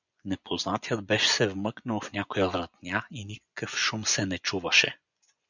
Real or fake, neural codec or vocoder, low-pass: fake; vocoder, 24 kHz, 100 mel bands, Vocos; 7.2 kHz